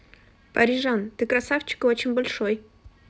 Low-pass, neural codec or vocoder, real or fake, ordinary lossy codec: none; none; real; none